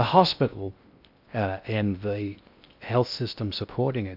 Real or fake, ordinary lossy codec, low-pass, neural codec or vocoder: fake; AAC, 48 kbps; 5.4 kHz; codec, 16 kHz in and 24 kHz out, 0.6 kbps, FocalCodec, streaming, 2048 codes